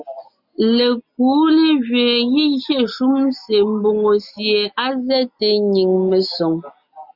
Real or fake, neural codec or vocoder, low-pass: real; none; 5.4 kHz